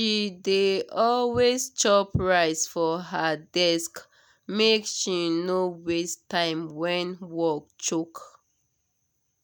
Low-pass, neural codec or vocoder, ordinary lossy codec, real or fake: none; none; none; real